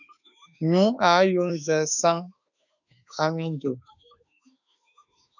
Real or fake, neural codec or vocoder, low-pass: fake; autoencoder, 48 kHz, 32 numbers a frame, DAC-VAE, trained on Japanese speech; 7.2 kHz